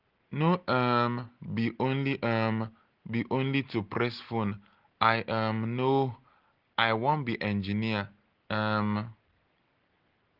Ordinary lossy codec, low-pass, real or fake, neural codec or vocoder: Opus, 16 kbps; 5.4 kHz; real; none